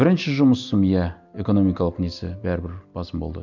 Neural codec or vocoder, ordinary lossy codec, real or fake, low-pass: none; none; real; 7.2 kHz